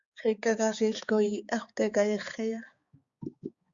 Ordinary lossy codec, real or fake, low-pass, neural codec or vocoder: Opus, 64 kbps; fake; 7.2 kHz; codec, 16 kHz, 4 kbps, X-Codec, HuBERT features, trained on balanced general audio